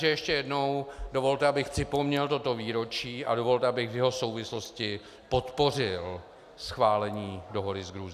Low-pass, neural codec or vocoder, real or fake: 14.4 kHz; none; real